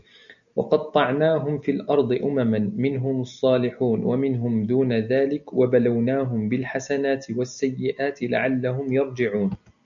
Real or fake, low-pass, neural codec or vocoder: real; 7.2 kHz; none